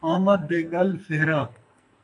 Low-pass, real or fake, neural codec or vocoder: 10.8 kHz; fake; codec, 44.1 kHz, 2.6 kbps, SNAC